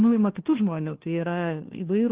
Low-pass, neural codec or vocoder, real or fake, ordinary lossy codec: 3.6 kHz; codec, 16 kHz, 1 kbps, FunCodec, trained on LibriTTS, 50 frames a second; fake; Opus, 16 kbps